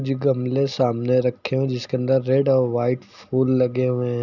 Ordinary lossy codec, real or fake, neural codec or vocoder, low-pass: none; real; none; 7.2 kHz